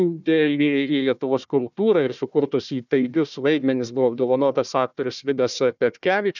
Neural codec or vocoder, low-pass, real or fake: codec, 16 kHz, 1 kbps, FunCodec, trained on Chinese and English, 50 frames a second; 7.2 kHz; fake